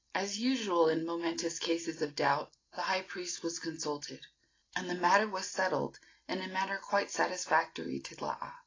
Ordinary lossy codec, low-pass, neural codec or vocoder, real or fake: AAC, 32 kbps; 7.2 kHz; none; real